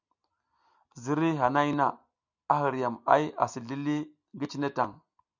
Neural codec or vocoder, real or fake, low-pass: none; real; 7.2 kHz